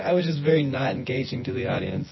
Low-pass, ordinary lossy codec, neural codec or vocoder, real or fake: 7.2 kHz; MP3, 24 kbps; vocoder, 24 kHz, 100 mel bands, Vocos; fake